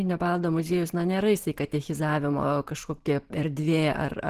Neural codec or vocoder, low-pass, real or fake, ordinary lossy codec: vocoder, 44.1 kHz, 128 mel bands every 512 samples, BigVGAN v2; 14.4 kHz; fake; Opus, 16 kbps